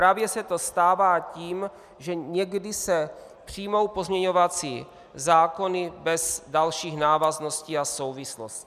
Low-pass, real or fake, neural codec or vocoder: 14.4 kHz; real; none